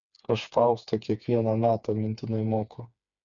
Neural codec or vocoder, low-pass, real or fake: codec, 16 kHz, 4 kbps, FreqCodec, smaller model; 7.2 kHz; fake